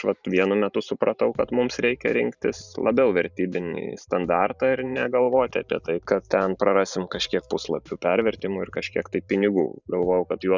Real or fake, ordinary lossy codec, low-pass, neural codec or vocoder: fake; Opus, 64 kbps; 7.2 kHz; vocoder, 44.1 kHz, 128 mel bands every 512 samples, BigVGAN v2